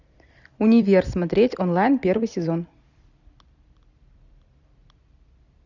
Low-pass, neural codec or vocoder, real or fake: 7.2 kHz; vocoder, 44.1 kHz, 128 mel bands every 256 samples, BigVGAN v2; fake